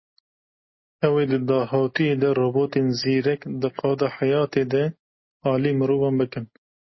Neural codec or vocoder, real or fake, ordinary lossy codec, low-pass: none; real; MP3, 24 kbps; 7.2 kHz